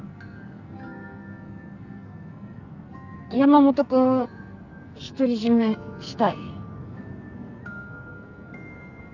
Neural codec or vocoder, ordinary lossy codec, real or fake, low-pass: codec, 44.1 kHz, 2.6 kbps, SNAC; none; fake; 7.2 kHz